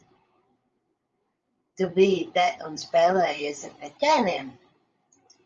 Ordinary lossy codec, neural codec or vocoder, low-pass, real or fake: Opus, 32 kbps; codec, 16 kHz, 8 kbps, FreqCodec, larger model; 7.2 kHz; fake